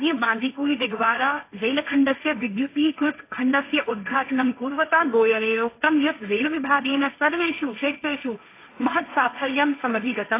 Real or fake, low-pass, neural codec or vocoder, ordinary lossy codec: fake; 3.6 kHz; codec, 16 kHz, 1.1 kbps, Voila-Tokenizer; AAC, 24 kbps